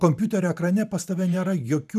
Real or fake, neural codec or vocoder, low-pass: fake; vocoder, 44.1 kHz, 128 mel bands every 512 samples, BigVGAN v2; 14.4 kHz